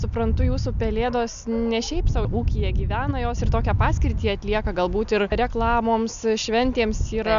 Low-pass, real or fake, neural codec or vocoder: 7.2 kHz; real; none